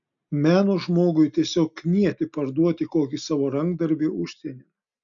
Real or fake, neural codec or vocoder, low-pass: real; none; 7.2 kHz